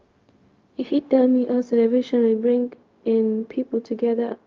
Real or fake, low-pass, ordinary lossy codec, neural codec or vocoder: fake; 7.2 kHz; Opus, 32 kbps; codec, 16 kHz, 0.4 kbps, LongCat-Audio-Codec